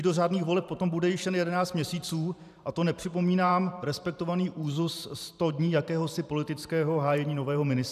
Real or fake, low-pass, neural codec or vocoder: fake; 14.4 kHz; vocoder, 44.1 kHz, 128 mel bands every 512 samples, BigVGAN v2